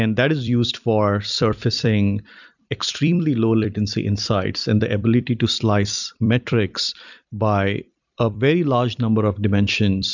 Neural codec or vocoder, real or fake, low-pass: vocoder, 44.1 kHz, 128 mel bands every 256 samples, BigVGAN v2; fake; 7.2 kHz